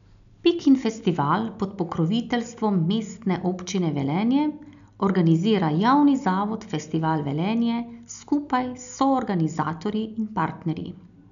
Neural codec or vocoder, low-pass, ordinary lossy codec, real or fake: none; 7.2 kHz; none; real